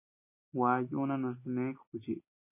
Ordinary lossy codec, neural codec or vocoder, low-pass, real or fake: MP3, 24 kbps; none; 3.6 kHz; real